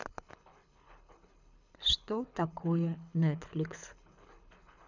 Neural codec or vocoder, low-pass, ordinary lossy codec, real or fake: codec, 24 kHz, 6 kbps, HILCodec; 7.2 kHz; none; fake